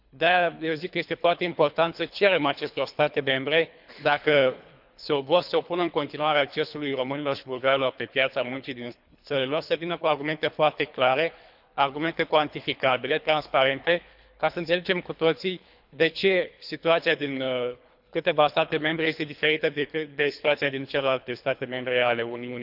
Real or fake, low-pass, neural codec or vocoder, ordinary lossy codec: fake; 5.4 kHz; codec, 24 kHz, 3 kbps, HILCodec; none